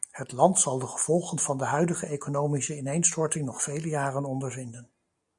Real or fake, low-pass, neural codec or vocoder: real; 10.8 kHz; none